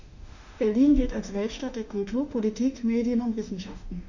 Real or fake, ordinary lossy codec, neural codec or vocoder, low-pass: fake; none; autoencoder, 48 kHz, 32 numbers a frame, DAC-VAE, trained on Japanese speech; 7.2 kHz